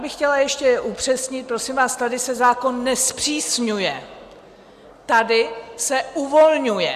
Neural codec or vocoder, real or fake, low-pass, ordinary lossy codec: none; real; 14.4 kHz; Opus, 64 kbps